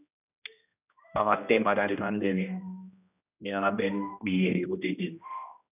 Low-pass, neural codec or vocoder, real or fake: 3.6 kHz; codec, 16 kHz, 1 kbps, X-Codec, HuBERT features, trained on general audio; fake